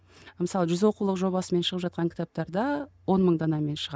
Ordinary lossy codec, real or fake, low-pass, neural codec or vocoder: none; real; none; none